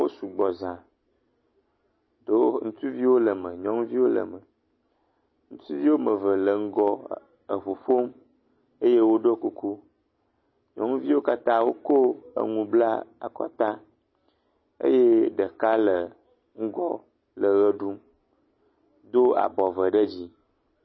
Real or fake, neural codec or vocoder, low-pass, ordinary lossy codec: real; none; 7.2 kHz; MP3, 24 kbps